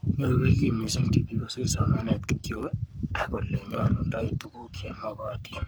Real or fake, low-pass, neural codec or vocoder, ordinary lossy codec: fake; none; codec, 44.1 kHz, 3.4 kbps, Pupu-Codec; none